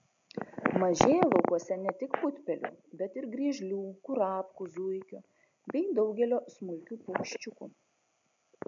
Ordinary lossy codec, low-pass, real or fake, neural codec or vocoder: MP3, 64 kbps; 7.2 kHz; real; none